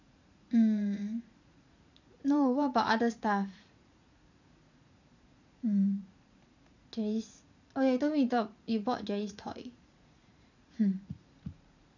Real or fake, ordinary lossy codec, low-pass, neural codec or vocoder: fake; none; 7.2 kHz; vocoder, 44.1 kHz, 80 mel bands, Vocos